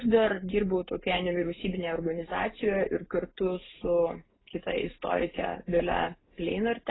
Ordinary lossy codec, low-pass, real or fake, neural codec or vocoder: AAC, 16 kbps; 7.2 kHz; real; none